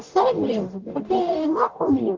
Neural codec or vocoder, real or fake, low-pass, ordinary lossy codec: codec, 44.1 kHz, 0.9 kbps, DAC; fake; 7.2 kHz; Opus, 32 kbps